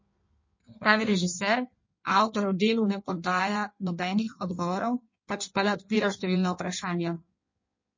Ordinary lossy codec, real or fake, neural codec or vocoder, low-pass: MP3, 32 kbps; fake; codec, 16 kHz in and 24 kHz out, 1.1 kbps, FireRedTTS-2 codec; 7.2 kHz